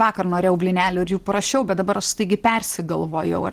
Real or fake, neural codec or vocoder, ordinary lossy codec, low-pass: real; none; Opus, 16 kbps; 14.4 kHz